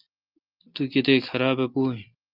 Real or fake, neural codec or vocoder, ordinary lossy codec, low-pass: real; none; Opus, 32 kbps; 5.4 kHz